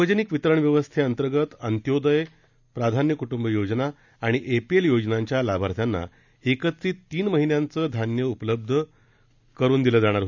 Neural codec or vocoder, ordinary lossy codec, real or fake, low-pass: none; none; real; 7.2 kHz